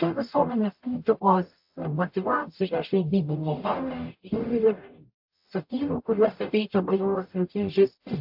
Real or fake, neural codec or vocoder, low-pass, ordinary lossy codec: fake; codec, 44.1 kHz, 0.9 kbps, DAC; 5.4 kHz; AAC, 48 kbps